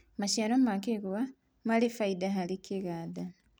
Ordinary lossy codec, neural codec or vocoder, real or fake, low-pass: none; none; real; none